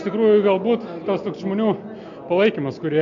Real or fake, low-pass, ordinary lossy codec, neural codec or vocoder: real; 7.2 kHz; MP3, 48 kbps; none